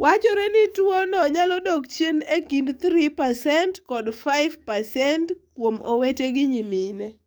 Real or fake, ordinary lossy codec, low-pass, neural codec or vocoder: fake; none; none; codec, 44.1 kHz, 7.8 kbps, DAC